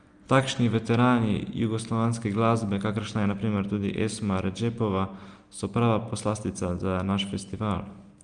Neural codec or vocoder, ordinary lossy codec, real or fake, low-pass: none; Opus, 32 kbps; real; 9.9 kHz